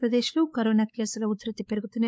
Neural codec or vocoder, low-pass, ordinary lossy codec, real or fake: codec, 16 kHz, 4 kbps, X-Codec, WavLM features, trained on Multilingual LibriSpeech; none; none; fake